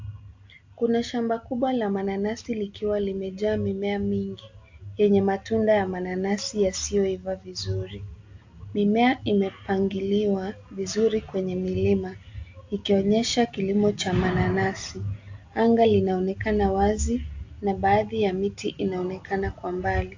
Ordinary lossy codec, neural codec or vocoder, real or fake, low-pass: AAC, 48 kbps; none; real; 7.2 kHz